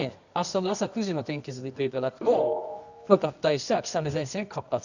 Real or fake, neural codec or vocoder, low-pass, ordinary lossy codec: fake; codec, 24 kHz, 0.9 kbps, WavTokenizer, medium music audio release; 7.2 kHz; none